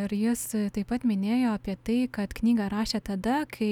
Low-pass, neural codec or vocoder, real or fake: 19.8 kHz; none; real